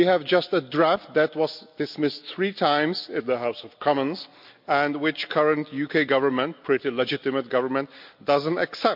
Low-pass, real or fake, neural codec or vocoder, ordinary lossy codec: 5.4 kHz; real; none; MP3, 48 kbps